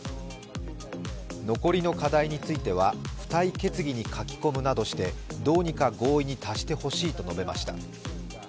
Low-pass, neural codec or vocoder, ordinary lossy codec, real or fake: none; none; none; real